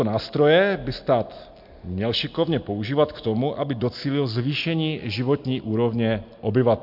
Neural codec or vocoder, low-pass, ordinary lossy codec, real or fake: none; 5.4 kHz; MP3, 48 kbps; real